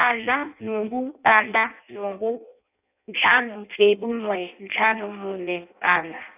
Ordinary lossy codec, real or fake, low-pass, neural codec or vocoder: none; fake; 3.6 kHz; codec, 16 kHz in and 24 kHz out, 0.6 kbps, FireRedTTS-2 codec